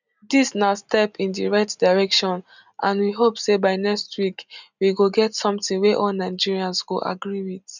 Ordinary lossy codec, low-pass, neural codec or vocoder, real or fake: none; 7.2 kHz; none; real